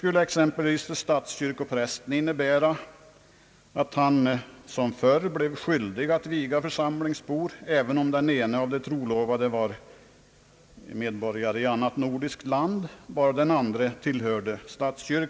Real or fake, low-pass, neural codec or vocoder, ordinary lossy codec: real; none; none; none